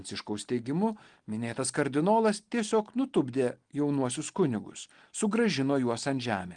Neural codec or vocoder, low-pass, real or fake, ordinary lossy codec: none; 9.9 kHz; real; Opus, 24 kbps